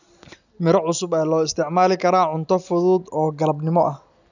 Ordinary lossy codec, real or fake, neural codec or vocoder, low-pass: none; real; none; 7.2 kHz